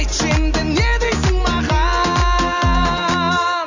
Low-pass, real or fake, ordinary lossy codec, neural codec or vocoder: 7.2 kHz; real; Opus, 64 kbps; none